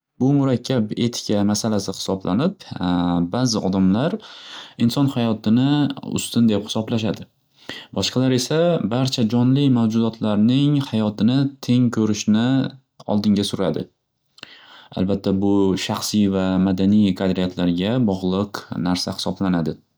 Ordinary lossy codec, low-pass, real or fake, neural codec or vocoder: none; none; real; none